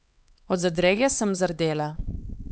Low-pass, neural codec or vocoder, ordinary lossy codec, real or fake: none; codec, 16 kHz, 4 kbps, X-Codec, HuBERT features, trained on LibriSpeech; none; fake